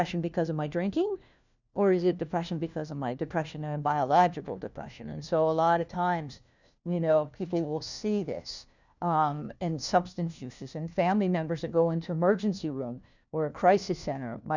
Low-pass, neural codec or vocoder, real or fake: 7.2 kHz; codec, 16 kHz, 1 kbps, FunCodec, trained on LibriTTS, 50 frames a second; fake